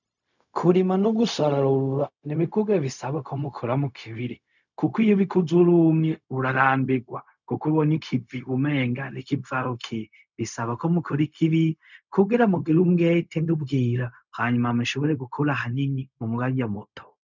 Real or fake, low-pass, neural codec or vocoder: fake; 7.2 kHz; codec, 16 kHz, 0.4 kbps, LongCat-Audio-Codec